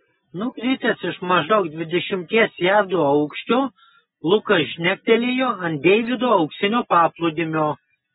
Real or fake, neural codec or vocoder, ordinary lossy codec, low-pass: real; none; AAC, 16 kbps; 10.8 kHz